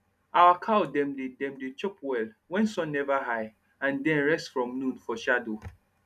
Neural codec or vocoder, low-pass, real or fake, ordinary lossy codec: none; 14.4 kHz; real; none